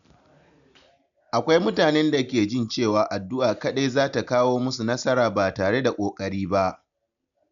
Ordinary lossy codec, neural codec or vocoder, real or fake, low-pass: none; none; real; 7.2 kHz